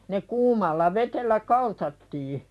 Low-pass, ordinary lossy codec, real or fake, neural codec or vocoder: none; none; real; none